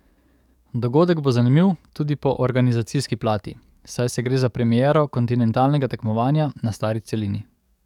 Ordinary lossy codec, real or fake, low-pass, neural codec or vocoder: none; fake; 19.8 kHz; codec, 44.1 kHz, 7.8 kbps, DAC